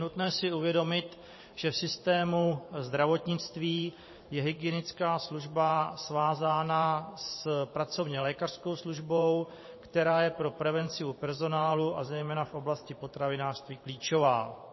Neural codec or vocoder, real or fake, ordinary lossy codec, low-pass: vocoder, 44.1 kHz, 128 mel bands every 512 samples, BigVGAN v2; fake; MP3, 24 kbps; 7.2 kHz